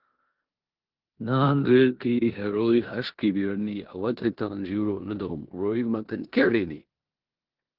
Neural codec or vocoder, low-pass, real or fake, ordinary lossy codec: codec, 16 kHz in and 24 kHz out, 0.9 kbps, LongCat-Audio-Codec, four codebook decoder; 5.4 kHz; fake; Opus, 16 kbps